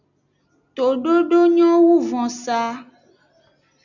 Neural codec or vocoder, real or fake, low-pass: none; real; 7.2 kHz